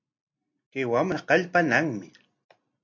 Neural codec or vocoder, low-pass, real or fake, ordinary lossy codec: none; 7.2 kHz; real; MP3, 48 kbps